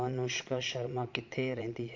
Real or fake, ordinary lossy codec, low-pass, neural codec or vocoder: fake; MP3, 48 kbps; 7.2 kHz; vocoder, 22.05 kHz, 80 mel bands, Vocos